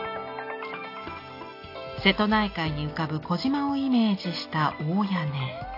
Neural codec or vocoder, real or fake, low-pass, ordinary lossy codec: none; real; 5.4 kHz; AAC, 32 kbps